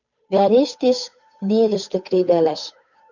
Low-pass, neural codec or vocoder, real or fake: 7.2 kHz; codec, 16 kHz, 8 kbps, FunCodec, trained on Chinese and English, 25 frames a second; fake